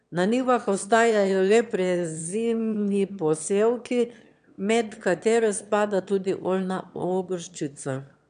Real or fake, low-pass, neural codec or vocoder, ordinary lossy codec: fake; 9.9 kHz; autoencoder, 22.05 kHz, a latent of 192 numbers a frame, VITS, trained on one speaker; none